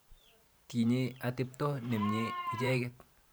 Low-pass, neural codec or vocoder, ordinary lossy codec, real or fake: none; vocoder, 44.1 kHz, 128 mel bands every 512 samples, BigVGAN v2; none; fake